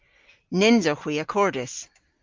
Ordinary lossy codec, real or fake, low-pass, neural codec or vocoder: Opus, 24 kbps; real; 7.2 kHz; none